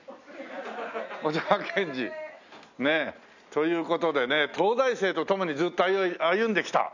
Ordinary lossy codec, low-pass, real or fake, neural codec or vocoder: none; 7.2 kHz; real; none